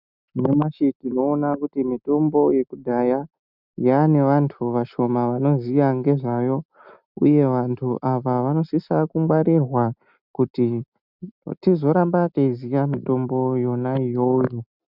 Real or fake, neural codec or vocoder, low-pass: real; none; 5.4 kHz